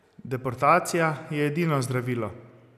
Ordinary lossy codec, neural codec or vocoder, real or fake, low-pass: none; none; real; 14.4 kHz